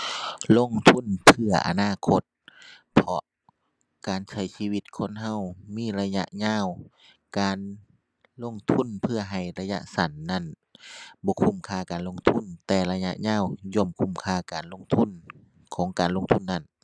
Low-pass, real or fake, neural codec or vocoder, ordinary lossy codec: none; real; none; none